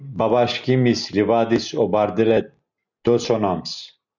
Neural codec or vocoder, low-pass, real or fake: none; 7.2 kHz; real